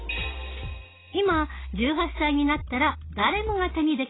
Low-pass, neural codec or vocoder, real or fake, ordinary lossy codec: 7.2 kHz; none; real; AAC, 16 kbps